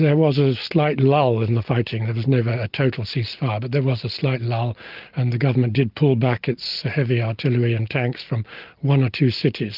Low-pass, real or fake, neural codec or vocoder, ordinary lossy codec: 5.4 kHz; real; none; Opus, 24 kbps